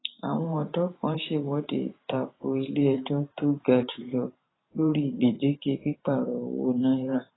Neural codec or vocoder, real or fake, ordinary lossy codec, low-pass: vocoder, 44.1 kHz, 128 mel bands every 512 samples, BigVGAN v2; fake; AAC, 16 kbps; 7.2 kHz